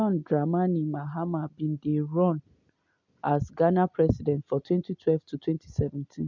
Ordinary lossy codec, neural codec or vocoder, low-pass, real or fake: none; none; 7.2 kHz; real